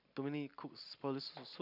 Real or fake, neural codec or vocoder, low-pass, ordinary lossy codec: real; none; 5.4 kHz; none